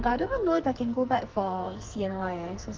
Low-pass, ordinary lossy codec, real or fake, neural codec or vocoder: 7.2 kHz; Opus, 24 kbps; fake; codec, 44.1 kHz, 2.6 kbps, SNAC